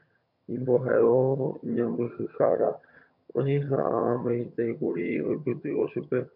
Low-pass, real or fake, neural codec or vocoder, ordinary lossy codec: 5.4 kHz; fake; vocoder, 22.05 kHz, 80 mel bands, HiFi-GAN; MP3, 48 kbps